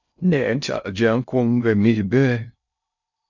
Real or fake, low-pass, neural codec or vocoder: fake; 7.2 kHz; codec, 16 kHz in and 24 kHz out, 0.6 kbps, FocalCodec, streaming, 2048 codes